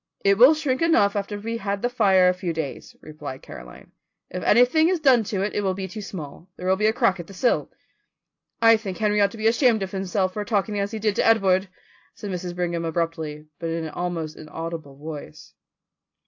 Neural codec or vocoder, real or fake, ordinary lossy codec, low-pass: none; real; AAC, 48 kbps; 7.2 kHz